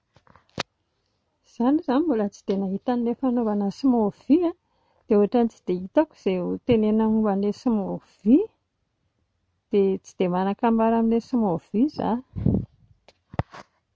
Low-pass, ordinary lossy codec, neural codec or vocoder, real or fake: none; none; none; real